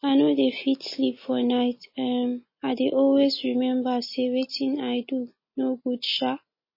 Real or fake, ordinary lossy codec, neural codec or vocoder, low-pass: real; MP3, 24 kbps; none; 5.4 kHz